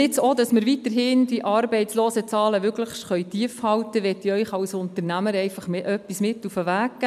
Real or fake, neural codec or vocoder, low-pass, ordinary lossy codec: real; none; 14.4 kHz; MP3, 96 kbps